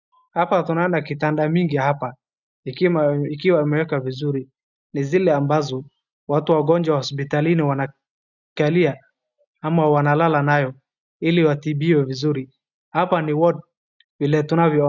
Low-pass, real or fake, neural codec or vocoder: 7.2 kHz; real; none